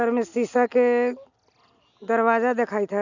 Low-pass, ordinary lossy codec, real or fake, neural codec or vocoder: 7.2 kHz; none; real; none